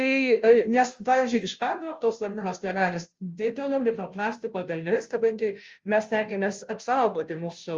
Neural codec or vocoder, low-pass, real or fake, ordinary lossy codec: codec, 16 kHz, 0.5 kbps, FunCodec, trained on Chinese and English, 25 frames a second; 7.2 kHz; fake; Opus, 32 kbps